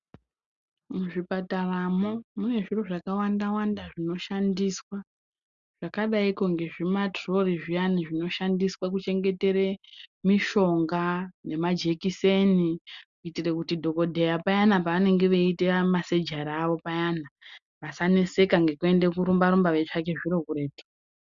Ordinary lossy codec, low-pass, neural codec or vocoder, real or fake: Opus, 64 kbps; 7.2 kHz; none; real